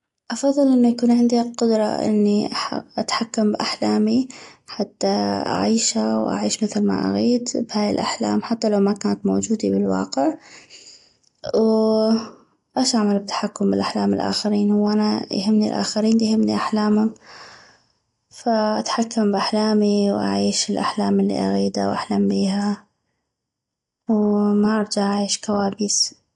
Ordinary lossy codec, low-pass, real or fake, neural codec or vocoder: AAC, 48 kbps; 10.8 kHz; real; none